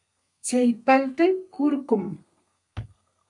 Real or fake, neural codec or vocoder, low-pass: fake; codec, 32 kHz, 1.9 kbps, SNAC; 10.8 kHz